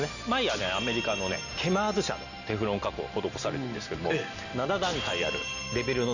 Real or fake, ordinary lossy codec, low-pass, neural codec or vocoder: real; none; 7.2 kHz; none